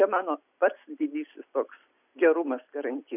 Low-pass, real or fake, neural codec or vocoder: 3.6 kHz; real; none